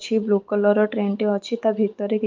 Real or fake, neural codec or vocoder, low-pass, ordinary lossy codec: real; none; 7.2 kHz; Opus, 32 kbps